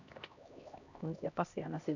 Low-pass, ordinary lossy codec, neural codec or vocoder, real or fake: 7.2 kHz; none; codec, 16 kHz, 1 kbps, X-Codec, HuBERT features, trained on LibriSpeech; fake